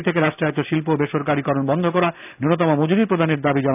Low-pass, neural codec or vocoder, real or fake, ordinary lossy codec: 3.6 kHz; none; real; none